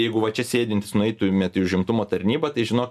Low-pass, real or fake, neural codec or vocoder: 14.4 kHz; real; none